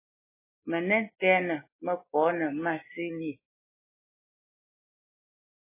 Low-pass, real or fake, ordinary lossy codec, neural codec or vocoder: 3.6 kHz; real; MP3, 16 kbps; none